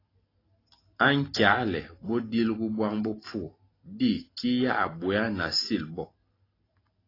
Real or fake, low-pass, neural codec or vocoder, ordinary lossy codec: real; 5.4 kHz; none; AAC, 24 kbps